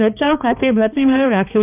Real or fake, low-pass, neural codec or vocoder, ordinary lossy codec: fake; 3.6 kHz; codec, 16 kHz, 2 kbps, X-Codec, HuBERT features, trained on balanced general audio; none